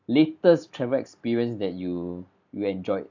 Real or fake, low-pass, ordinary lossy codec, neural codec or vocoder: real; 7.2 kHz; AAC, 48 kbps; none